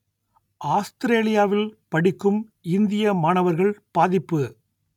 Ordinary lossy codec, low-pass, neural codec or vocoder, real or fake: none; 19.8 kHz; none; real